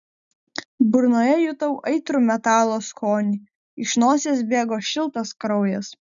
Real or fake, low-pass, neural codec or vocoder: real; 7.2 kHz; none